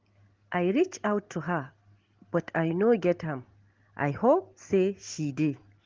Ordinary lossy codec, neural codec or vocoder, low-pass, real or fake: Opus, 16 kbps; none; 7.2 kHz; real